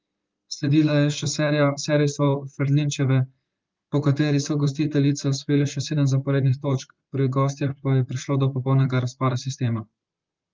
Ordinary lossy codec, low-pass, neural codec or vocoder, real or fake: Opus, 32 kbps; 7.2 kHz; vocoder, 44.1 kHz, 128 mel bands, Pupu-Vocoder; fake